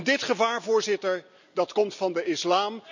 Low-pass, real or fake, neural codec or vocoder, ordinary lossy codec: 7.2 kHz; real; none; none